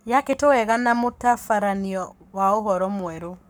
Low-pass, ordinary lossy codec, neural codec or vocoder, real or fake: none; none; codec, 44.1 kHz, 7.8 kbps, Pupu-Codec; fake